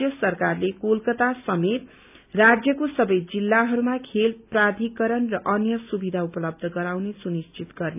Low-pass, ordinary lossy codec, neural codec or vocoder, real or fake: 3.6 kHz; none; none; real